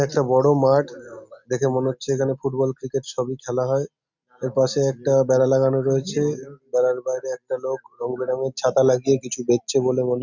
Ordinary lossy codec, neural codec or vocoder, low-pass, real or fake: Opus, 64 kbps; none; 7.2 kHz; real